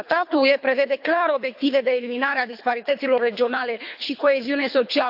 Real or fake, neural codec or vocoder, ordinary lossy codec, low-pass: fake; codec, 24 kHz, 3 kbps, HILCodec; AAC, 48 kbps; 5.4 kHz